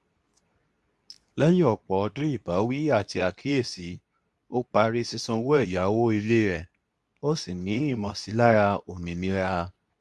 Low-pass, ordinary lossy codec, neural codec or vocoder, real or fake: none; none; codec, 24 kHz, 0.9 kbps, WavTokenizer, medium speech release version 2; fake